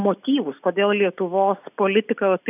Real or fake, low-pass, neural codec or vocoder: real; 3.6 kHz; none